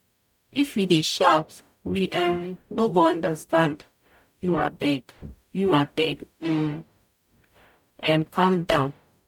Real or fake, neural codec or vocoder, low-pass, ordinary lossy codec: fake; codec, 44.1 kHz, 0.9 kbps, DAC; 19.8 kHz; none